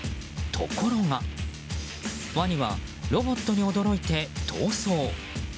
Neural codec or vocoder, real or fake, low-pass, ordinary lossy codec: none; real; none; none